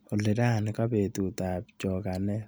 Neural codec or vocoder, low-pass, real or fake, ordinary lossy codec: none; none; real; none